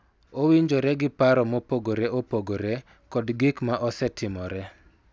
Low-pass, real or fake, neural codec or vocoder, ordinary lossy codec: none; real; none; none